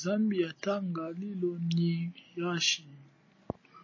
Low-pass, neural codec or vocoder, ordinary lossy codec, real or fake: 7.2 kHz; none; MP3, 32 kbps; real